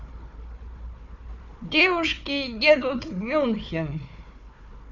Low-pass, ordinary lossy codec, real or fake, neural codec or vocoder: 7.2 kHz; none; fake; codec, 16 kHz, 4 kbps, FunCodec, trained on Chinese and English, 50 frames a second